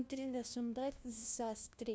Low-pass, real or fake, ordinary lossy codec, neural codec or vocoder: none; fake; none; codec, 16 kHz, 1 kbps, FunCodec, trained on LibriTTS, 50 frames a second